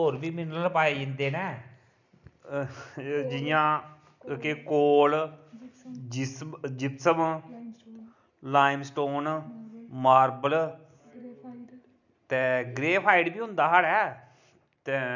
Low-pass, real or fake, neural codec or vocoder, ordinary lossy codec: 7.2 kHz; real; none; none